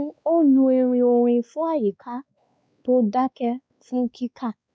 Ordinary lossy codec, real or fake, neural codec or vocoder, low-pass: none; fake; codec, 16 kHz, 2 kbps, X-Codec, WavLM features, trained on Multilingual LibriSpeech; none